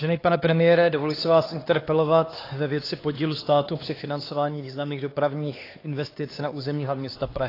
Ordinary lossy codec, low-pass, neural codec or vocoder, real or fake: AAC, 24 kbps; 5.4 kHz; codec, 16 kHz, 2 kbps, X-Codec, HuBERT features, trained on LibriSpeech; fake